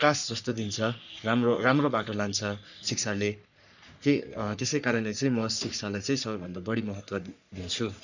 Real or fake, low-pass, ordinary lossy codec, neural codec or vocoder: fake; 7.2 kHz; none; codec, 44.1 kHz, 3.4 kbps, Pupu-Codec